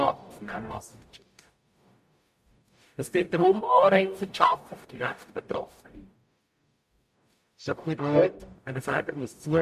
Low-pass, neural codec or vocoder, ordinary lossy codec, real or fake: 14.4 kHz; codec, 44.1 kHz, 0.9 kbps, DAC; MP3, 64 kbps; fake